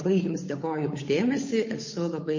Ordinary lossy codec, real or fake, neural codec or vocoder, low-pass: MP3, 32 kbps; fake; codec, 16 kHz, 8 kbps, FunCodec, trained on Chinese and English, 25 frames a second; 7.2 kHz